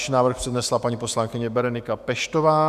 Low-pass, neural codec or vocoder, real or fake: 14.4 kHz; autoencoder, 48 kHz, 128 numbers a frame, DAC-VAE, trained on Japanese speech; fake